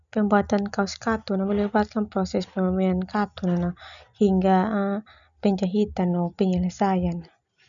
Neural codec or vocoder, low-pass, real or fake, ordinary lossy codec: none; 7.2 kHz; real; none